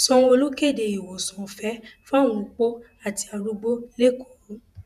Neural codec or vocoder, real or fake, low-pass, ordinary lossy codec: vocoder, 48 kHz, 128 mel bands, Vocos; fake; 14.4 kHz; none